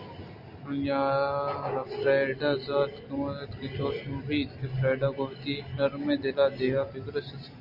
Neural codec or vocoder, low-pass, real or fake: none; 5.4 kHz; real